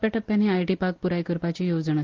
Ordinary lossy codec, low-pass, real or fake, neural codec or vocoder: Opus, 16 kbps; 7.2 kHz; real; none